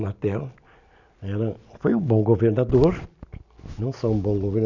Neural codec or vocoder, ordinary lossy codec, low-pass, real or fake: none; none; 7.2 kHz; real